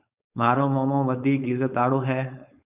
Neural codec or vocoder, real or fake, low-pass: codec, 16 kHz, 4.8 kbps, FACodec; fake; 3.6 kHz